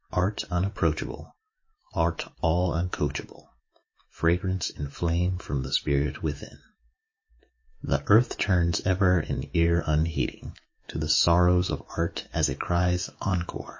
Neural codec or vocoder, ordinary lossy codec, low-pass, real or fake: autoencoder, 48 kHz, 128 numbers a frame, DAC-VAE, trained on Japanese speech; MP3, 32 kbps; 7.2 kHz; fake